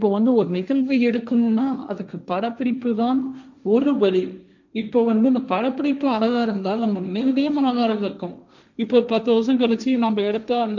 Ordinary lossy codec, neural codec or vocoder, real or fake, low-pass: none; codec, 16 kHz, 1.1 kbps, Voila-Tokenizer; fake; 7.2 kHz